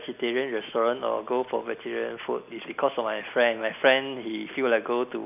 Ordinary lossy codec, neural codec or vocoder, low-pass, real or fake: none; none; 3.6 kHz; real